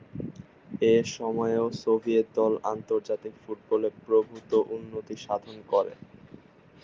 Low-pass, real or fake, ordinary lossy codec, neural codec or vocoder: 7.2 kHz; real; Opus, 32 kbps; none